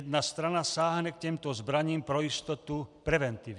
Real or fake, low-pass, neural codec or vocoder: real; 10.8 kHz; none